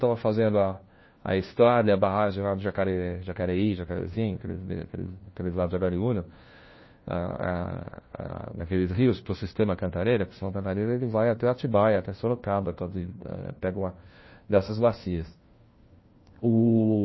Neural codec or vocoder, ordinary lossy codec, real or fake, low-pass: codec, 16 kHz, 1 kbps, FunCodec, trained on LibriTTS, 50 frames a second; MP3, 24 kbps; fake; 7.2 kHz